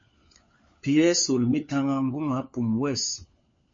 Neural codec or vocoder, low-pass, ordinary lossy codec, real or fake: codec, 16 kHz, 4 kbps, FunCodec, trained on LibriTTS, 50 frames a second; 7.2 kHz; MP3, 32 kbps; fake